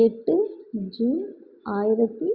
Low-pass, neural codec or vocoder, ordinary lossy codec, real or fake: 5.4 kHz; none; Opus, 64 kbps; real